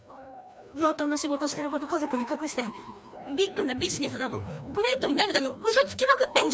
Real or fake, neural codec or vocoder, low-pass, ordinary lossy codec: fake; codec, 16 kHz, 1 kbps, FreqCodec, larger model; none; none